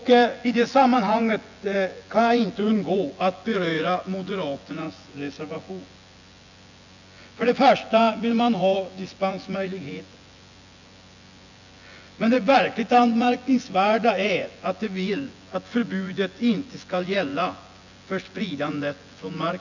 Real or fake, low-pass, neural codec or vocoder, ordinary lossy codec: fake; 7.2 kHz; vocoder, 24 kHz, 100 mel bands, Vocos; none